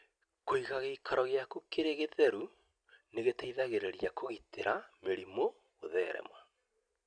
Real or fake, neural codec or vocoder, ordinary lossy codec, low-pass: real; none; none; 9.9 kHz